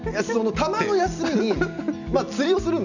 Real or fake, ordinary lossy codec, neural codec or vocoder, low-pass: real; none; none; 7.2 kHz